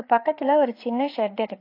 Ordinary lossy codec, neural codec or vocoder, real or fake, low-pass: AAC, 32 kbps; codec, 16 kHz, 4 kbps, FreqCodec, larger model; fake; 5.4 kHz